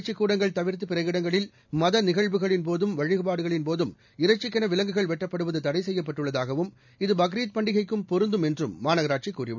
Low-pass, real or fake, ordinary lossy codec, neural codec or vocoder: 7.2 kHz; real; none; none